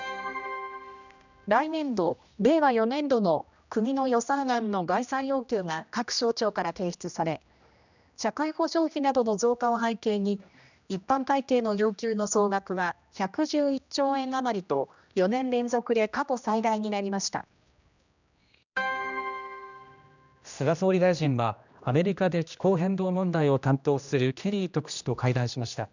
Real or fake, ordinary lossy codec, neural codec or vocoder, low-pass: fake; none; codec, 16 kHz, 1 kbps, X-Codec, HuBERT features, trained on general audio; 7.2 kHz